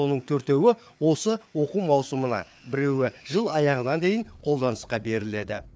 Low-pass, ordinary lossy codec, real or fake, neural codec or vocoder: none; none; fake; codec, 16 kHz, 4 kbps, FreqCodec, larger model